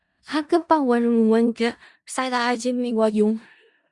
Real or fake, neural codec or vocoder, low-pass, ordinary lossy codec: fake; codec, 16 kHz in and 24 kHz out, 0.4 kbps, LongCat-Audio-Codec, four codebook decoder; 10.8 kHz; Opus, 64 kbps